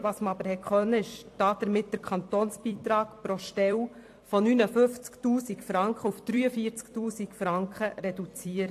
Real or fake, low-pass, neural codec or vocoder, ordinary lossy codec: fake; 14.4 kHz; vocoder, 44.1 kHz, 128 mel bands every 256 samples, BigVGAN v2; AAC, 64 kbps